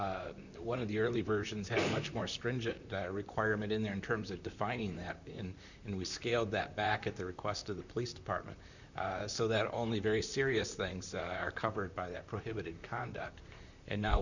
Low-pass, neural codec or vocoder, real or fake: 7.2 kHz; vocoder, 44.1 kHz, 128 mel bands, Pupu-Vocoder; fake